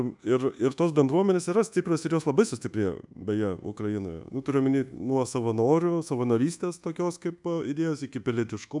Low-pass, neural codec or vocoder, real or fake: 10.8 kHz; codec, 24 kHz, 1.2 kbps, DualCodec; fake